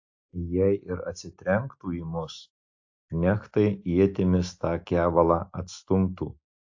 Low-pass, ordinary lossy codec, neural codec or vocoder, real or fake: 7.2 kHz; AAC, 48 kbps; none; real